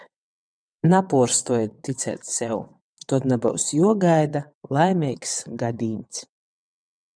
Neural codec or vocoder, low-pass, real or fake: vocoder, 22.05 kHz, 80 mel bands, WaveNeXt; 9.9 kHz; fake